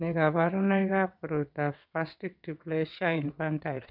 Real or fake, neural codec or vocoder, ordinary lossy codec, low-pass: fake; vocoder, 22.05 kHz, 80 mel bands, WaveNeXt; none; 5.4 kHz